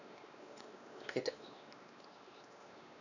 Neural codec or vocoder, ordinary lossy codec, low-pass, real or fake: codec, 16 kHz, 2 kbps, X-Codec, WavLM features, trained on Multilingual LibriSpeech; none; 7.2 kHz; fake